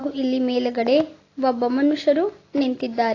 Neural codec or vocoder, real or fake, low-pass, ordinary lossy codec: none; real; 7.2 kHz; AAC, 32 kbps